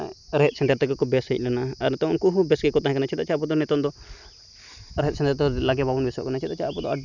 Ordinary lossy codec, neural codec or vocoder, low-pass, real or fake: none; none; 7.2 kHz; real